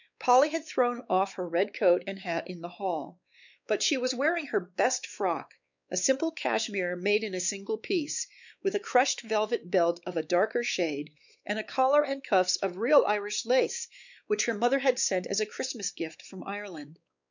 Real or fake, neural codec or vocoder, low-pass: fake; codec, 16 kHz, 4 kbps, X-Codec, WavLM features, trained on Multilingual LibriSpeech; 7.2 kHz